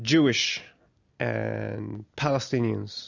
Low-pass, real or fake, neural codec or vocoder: 7.2 kHz; real; none